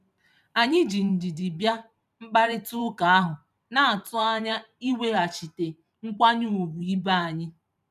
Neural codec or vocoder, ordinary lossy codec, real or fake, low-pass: vocoder, 44.1 kHz, 128 mel bands every 512 samples, BigVGAN v2; none; fake; 14.4 kHz